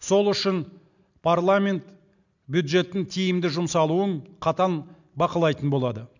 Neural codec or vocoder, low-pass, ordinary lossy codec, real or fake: none; 7.2 kHz; none; real